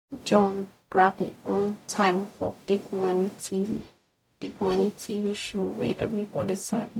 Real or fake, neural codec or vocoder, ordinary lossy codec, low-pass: fake; codec, 44.1 kHz, 0.9 kbps, DAC; MP3, 96 kbps; 19.8 kHz